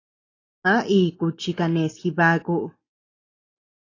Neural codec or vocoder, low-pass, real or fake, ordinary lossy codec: none; 7.2 kHz; real; AAC, 32 kbps